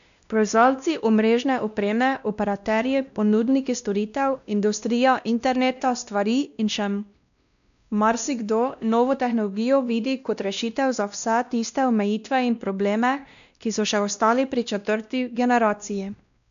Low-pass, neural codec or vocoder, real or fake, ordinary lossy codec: 7.2 kHz; codec, 16 kHz, 1 kbps, X-Codec, WavLM features, trained on Multilingual LibriSpeech; fake; none